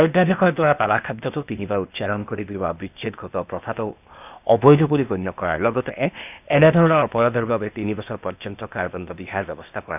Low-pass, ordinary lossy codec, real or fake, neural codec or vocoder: 3.6 kHz; none; fake; codec, 16 kHz, 0.8 kbps, ZipCodec